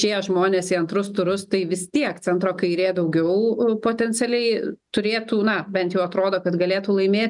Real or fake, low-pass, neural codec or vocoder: real; 10.8 kHz; none